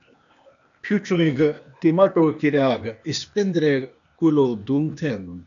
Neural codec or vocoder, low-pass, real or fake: codec, 16 kHz, 0.8 kbps, ZipCodec; 7.2 kHz; fake